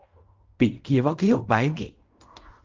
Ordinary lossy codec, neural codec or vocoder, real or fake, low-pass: Opus, 32 kbps; codec, 16 kHz in and 24 kHz out, 0.4 kbps, LongCat-Audio-Codec, fine tuned four codebook decoder; fake; 7.2 kHz